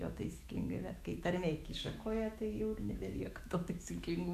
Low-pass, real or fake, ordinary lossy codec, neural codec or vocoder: 14.4 kHz; fake; AAC, 96 kbps; autoencoder, 48 kHz, 128 numbers a frame, DAC-VAE, trained on Japanese speech